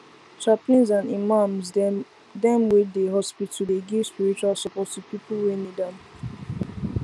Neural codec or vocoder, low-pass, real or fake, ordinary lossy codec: none; none; real; none